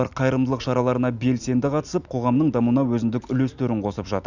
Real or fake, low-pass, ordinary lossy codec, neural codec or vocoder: real; 7.2 kHz; none; none